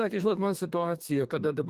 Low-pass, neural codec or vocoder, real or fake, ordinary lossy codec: 14.4 kHz; codec, 32 kHz, 1.9 kbps, SNAC; fake; Opus, 24 kbps